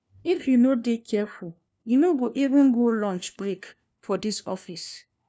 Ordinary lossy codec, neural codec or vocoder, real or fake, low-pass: none; codec, 16 kHz, 1 kbps, FunCodec, trained on LibriTTS, 50 frames a second; fake; none